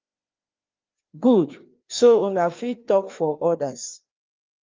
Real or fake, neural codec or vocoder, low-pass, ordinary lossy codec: fake; codec, 16 kHz, 2 kbps, FreqCodec, larger model; 7.2 kHz; Opus, 24 kbps